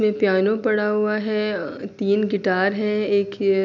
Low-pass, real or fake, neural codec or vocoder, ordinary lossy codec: 7.2 kHz; real; none; none